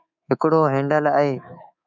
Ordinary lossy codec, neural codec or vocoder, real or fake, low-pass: MP3, 64 kbps; codec, 24 kHz, 3.1 kbps, DualCodec; fake; 7.2 kHz